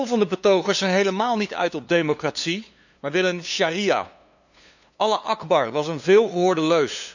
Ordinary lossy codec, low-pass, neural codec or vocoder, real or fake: none; 7.2 kHz; codec, 16 kHz, 2 kbps, FunCodec, trained on LibriTTS, 25 frames a second; fake